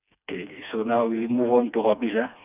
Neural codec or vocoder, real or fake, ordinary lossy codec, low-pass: codec, 16 kHz, 2 kbps, FreqCodec, smaller model; fake; none; 3.6 kHz